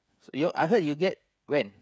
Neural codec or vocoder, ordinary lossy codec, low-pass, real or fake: codec, 16 kHz, 8 kbps, FreqCodec, smaller model; none; none; fake